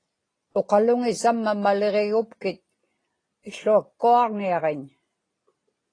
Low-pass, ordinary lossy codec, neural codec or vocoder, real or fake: 9.9 kHz; AAC, 32 kbps; none; real